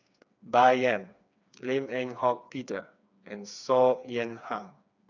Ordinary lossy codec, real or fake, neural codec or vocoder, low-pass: none; fake; codec, 16 kHz, 4 kbps, FreqCodec, smaller model; 7.2 kHz